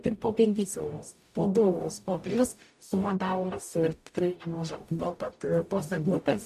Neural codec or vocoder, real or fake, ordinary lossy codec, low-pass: codec, 44.1 kHz, 0.9 kbps, DAC; fake; MP3, 96 kbps; 14.4 kHz